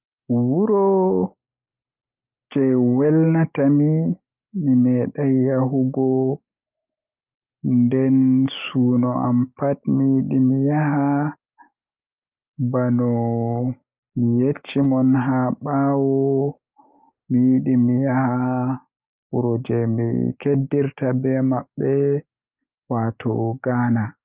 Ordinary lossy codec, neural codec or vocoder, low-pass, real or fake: Opus, 24 kbps; none; 3.6 kHz; real